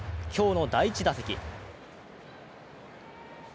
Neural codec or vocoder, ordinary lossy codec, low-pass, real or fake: none; none; none; real